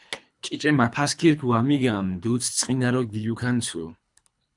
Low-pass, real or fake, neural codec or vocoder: 10.8 kHz; fake; codec, 24 kHz, 3 kbps, HILCodec